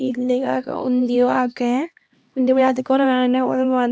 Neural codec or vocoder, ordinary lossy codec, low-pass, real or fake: codec, 16 kHz, 1 kbps, X-Codec, HuBERT features, trained on LibriSpeech; none; none; fake